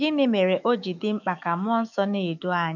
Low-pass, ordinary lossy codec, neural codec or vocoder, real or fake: 7.2 kHz; none; autoencoder, 48 kHz, 128 numbers a frame, DAC-VAE, trained on Japanese speech; fake